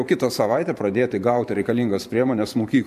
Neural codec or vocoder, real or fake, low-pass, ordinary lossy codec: vocoder, 44.1 kHz, 128 mel bands every 512 samples, BigVGAN v2; fake; 14.4 kHz; MP3, 64 kbps